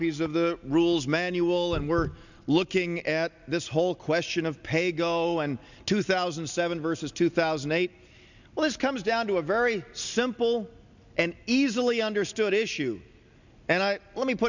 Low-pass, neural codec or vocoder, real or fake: 7.2 kHz; none; real